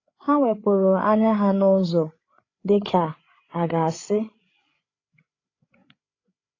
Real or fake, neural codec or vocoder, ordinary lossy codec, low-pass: fake; codec, 16 kHz, 8 kbps, FreqCodec, larger model; AAC, 32 kbps; 7.2 kHz